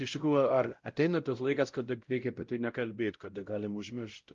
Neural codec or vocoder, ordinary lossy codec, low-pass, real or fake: codec, 16 kHz, 0.5 kbps, X-Codec, WavLM features, trained on Multilingual LibriSpeech; Opus, 32 kbps; 7.2 kHz; fake